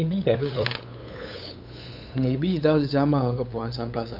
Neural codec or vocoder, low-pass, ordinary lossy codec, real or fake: codec, 16 kHz, 8 kbps, FunCodec, trained on LibriTTS, 25 frames a second; 5.4 kHz; none; fake